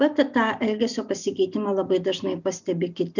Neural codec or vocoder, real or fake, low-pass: none; real; 7.2 kHz